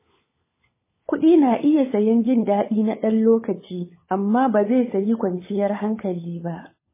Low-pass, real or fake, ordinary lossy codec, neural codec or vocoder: 3.6 kHz; fake; MP3, 16 kbps; codec, 16 kHz, 16 kbps, FunCodec, trained on LibriTTS, 50 frames a second